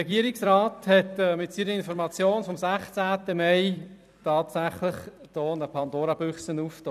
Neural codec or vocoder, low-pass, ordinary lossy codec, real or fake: vocoder, 44.1 kHz, 128 mel bands every 256 samples, BigVGAN v2; 14.4 kHz; none; fake